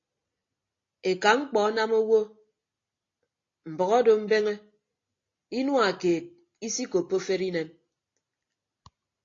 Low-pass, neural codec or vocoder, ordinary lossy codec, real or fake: 7.2 kHz; none; AAC, 32 kbps; real